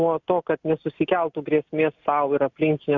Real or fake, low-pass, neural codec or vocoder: real; 7.2 kHz; none